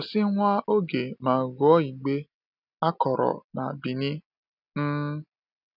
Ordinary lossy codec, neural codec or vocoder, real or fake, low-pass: none; none; real; 5.4 kHz